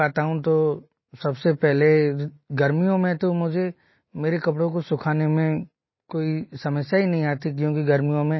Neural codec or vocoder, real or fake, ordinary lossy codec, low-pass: none; real; MP3, 24 kbps; 7.2 kHz